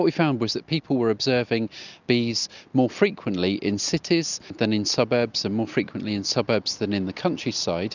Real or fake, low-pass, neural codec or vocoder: real; 7.2 kHz; none